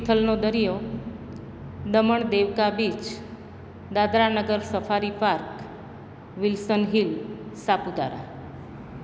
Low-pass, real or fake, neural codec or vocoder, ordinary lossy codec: none; real; none; none